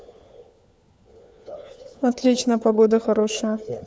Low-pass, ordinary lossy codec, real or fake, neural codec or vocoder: none; none; fake; codec, 16 kHz, 4 kbps, FunCodec, trained on LibriTTS, 50 frames a second